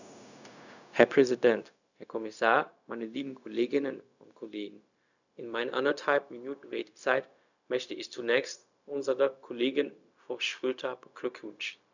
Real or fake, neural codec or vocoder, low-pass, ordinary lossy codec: fake; codec, 16 kHz, 0.4 kbps, LongCat-Audio-Codec; 7.2 kHz; none